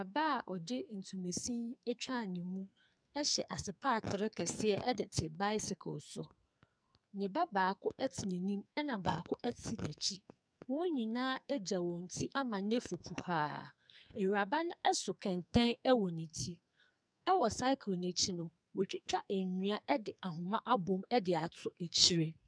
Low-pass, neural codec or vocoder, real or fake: 9.9 kHz; codec, 44.1 kHz, 2.6 kbps, SNAC; fake